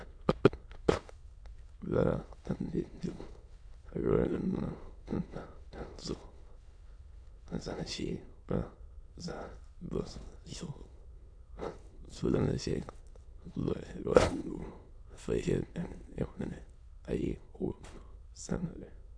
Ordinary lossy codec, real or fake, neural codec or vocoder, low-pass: AAC, 64 kbps; fake; autoencoder, 22.05 kHz, a latent of 192 numbers a frame, VITS, trained on many speakers; 9.9 kHz